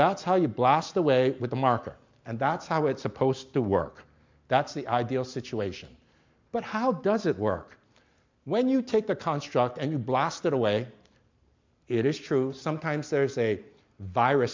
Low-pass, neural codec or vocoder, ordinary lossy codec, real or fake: 7.2 kHz; none; MP3, 64 kbps; real